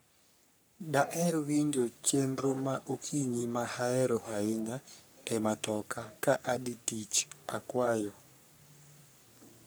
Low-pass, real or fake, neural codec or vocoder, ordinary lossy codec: none; fake; codec, 44.1 kHz, 3.4 kbps, Pupu-Codec; none